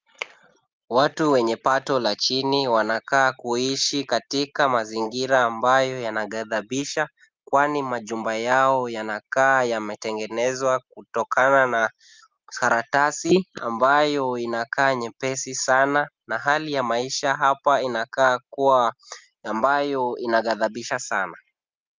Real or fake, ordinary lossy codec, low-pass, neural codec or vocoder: real; Opus, 24 kbps; 7.2 kHz; none